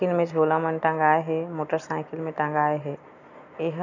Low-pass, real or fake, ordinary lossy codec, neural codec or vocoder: 7.2 kHz; real; none; none